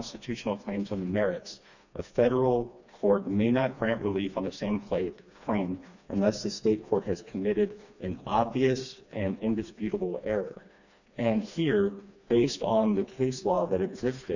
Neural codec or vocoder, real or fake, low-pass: codec, 16 kHz, 2 kbps, FreqCodec, smaller model; fake; 7.2 kHz